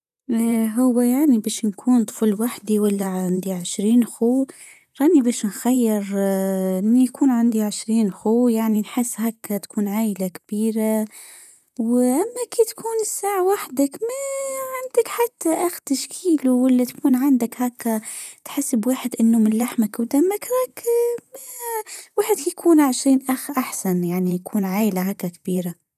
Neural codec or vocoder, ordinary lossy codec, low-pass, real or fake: vocoder, 44.1 kHz, 128 mel bands, Pupu-Vocoder; none; 14.4 kHz; fake